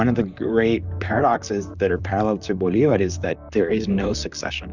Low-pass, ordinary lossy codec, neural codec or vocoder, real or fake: 7.2 kHz; Opus, 64 kbps; vocoder, 44.1 kHz, 128 mel bands, Pupu-Vocoder; fake